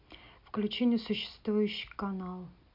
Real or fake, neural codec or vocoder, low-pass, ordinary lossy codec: real; none; 5.4 kHz; MP3, 48 kbps